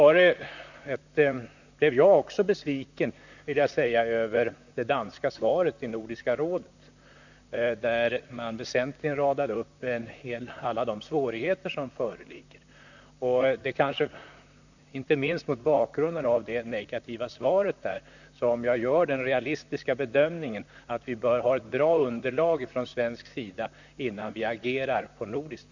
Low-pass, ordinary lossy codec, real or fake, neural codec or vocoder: 7.2 kHz; none; fake; vocoder, 44.1 kHz, 128 mel bands, Pupu-Vocoder